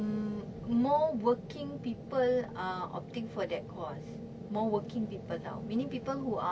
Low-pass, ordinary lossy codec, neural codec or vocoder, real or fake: none; none; none; real